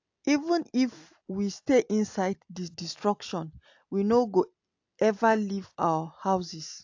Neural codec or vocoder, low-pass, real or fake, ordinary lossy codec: none; 7.2 kHz; real; AAC, 48 kbps